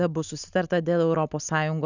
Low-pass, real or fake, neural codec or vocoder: 7.2 kHz; real; none